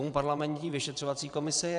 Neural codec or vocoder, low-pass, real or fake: vocoder, 22.05 kHz, 80 mel bands, WaveNeXt; 9.9 kHz; fake